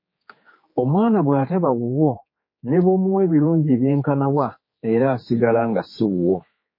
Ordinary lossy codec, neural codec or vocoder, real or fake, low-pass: MP3, 24 kbps; codec, 16 kHz, 4 kbps, X-Codec, HuBERT features, trained on general audio; fake; 5.4 kHz